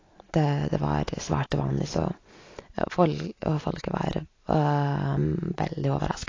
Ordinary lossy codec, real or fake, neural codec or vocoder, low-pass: AAC, 32 kbps; real; none; 7.2 kHz